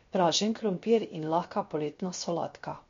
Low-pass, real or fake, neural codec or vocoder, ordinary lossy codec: 7.2 kHz; fake; codec, 16 kHz, 0.7 kbps, FocalCodec; MP3, 48 kbps